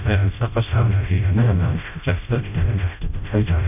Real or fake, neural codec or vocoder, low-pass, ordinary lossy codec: fake; codec, 16 kHz, 0.5 kbps, FreqCodec, smaller model; 3.6 kHz; none